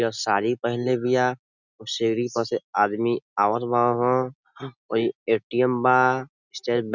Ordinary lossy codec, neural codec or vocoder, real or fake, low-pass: none; none; real; 7.2 kHz